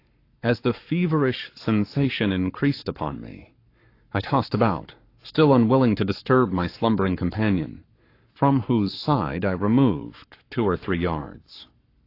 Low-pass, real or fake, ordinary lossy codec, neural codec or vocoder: 5.4 kHz; fake; AAC, 32 kbps; codec, 44.1 kHz, 7.8 kbps, Pupu-Codec